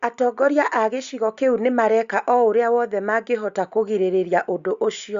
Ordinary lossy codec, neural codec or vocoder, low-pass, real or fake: Opus, 64 kbps; none; 7.2 kHz; real